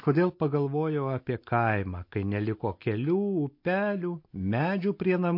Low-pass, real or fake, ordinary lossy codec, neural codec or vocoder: 5.4 kHz; real; MP3, 32 kbps; none